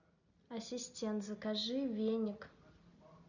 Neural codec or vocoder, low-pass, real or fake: none; 7.2 kHz; real